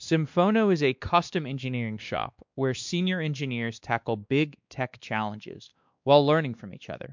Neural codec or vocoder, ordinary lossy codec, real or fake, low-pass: codec, 16 kHz, 2 kbps, X-Codec, WavLM features, trained on Multilingual LibriSpeech; MP3, 64 kbps; fake; 7.2 kHz